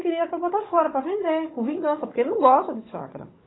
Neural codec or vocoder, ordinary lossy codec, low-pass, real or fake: codec, 16 kHz, 16 kbps, FunCodec, trained on Chinese and English, 50 frames a second; AAC, 16 kbps; 7.2 kHz; fake